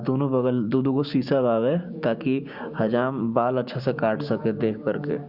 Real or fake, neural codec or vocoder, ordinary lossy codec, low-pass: fake; codec, 16 kHz, 6 kbps, DAC; Opus, 64 kbps; 5.4 kHz